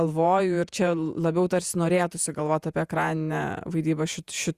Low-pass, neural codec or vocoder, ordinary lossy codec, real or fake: 14.4 kHz; vocoder, 48 kHz, 128 mel bands, Vocos; Opus, 64 kbps; fake